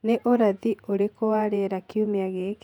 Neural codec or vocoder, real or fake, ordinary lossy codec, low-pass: vocoder, 48 kHz, 128 mel bands, Vocos; fake; none; 19.8 kHz